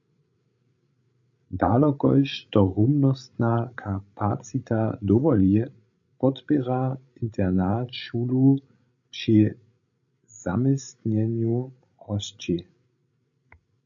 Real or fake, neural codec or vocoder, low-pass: fake; codec, 16 kHz, 16 kbps, FreqCodec, larger model; 7.2 kHz